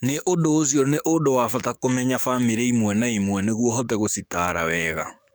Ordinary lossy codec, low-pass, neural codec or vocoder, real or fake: none; none; codec, 44.1 kHz, 7.8 kbps, DAC; fake